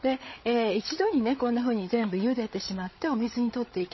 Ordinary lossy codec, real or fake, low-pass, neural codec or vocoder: MP3, 24 kbps; fake; 7.2 kHz; codec, 16 kHz, 8 kbps, FreqCodec, larger model